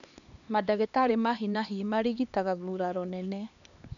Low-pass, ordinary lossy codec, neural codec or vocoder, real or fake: 7.2 kHz; none; codec, 16 kHz, 2 kbps, X-Codec, HuBERT features, trained on LibriSpeech; fake